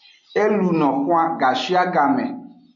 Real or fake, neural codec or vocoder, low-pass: real; none; 7.2 kHz